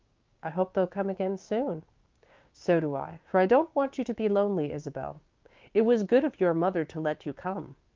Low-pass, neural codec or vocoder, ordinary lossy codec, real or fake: 7.2 kHz; codec, 16 kHz, 6 kbps, DAC; Opus, 24 kbps; fake